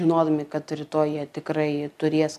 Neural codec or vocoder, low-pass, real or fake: none; 14.4 kHz; real